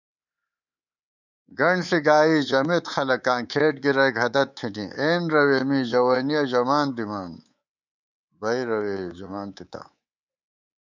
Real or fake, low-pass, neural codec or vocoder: fake; 7.2 kHz; codec, 24 kHz, 3.1 kbps, DualCodec